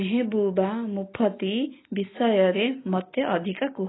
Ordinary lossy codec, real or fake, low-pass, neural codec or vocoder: AAC, 16 kbps; real; 7.2 kHz; none